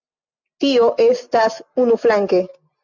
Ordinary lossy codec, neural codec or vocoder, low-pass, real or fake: MP3, 64 kbps; none; 7.2 kHz; real